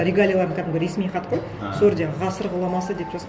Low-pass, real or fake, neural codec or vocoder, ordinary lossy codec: none; real; none; none